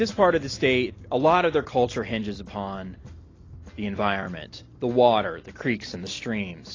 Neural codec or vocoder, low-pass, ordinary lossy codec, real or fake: none; 7.2 kHz; AAC, 32 kbps; real